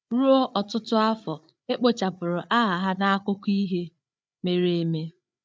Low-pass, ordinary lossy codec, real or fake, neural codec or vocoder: none; none; fake; codec, 16 kHz, 8 kbps, FreqCodec, larger model